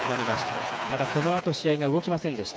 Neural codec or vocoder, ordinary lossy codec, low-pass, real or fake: codec, 16 kHz, 4 kbps, FreqCodec, smaller model; none; none; fake